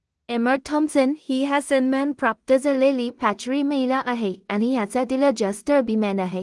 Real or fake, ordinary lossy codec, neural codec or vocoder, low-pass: fake; Opus, 24 kbps; codec, 16 kHz in and 24 kHz out, 0.4 kbps, LongCat-Audio-Codec, two codebook decoder; 10.8 kHz